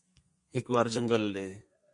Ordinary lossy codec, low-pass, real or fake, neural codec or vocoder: MP3, 48 kbps; 10.8 kHz; fake; codec, 32 kHz, 1.9 kbps, SNAC